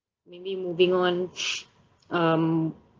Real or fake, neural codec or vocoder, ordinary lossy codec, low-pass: real; none; Opus, 32 kbps; 7.2 kHz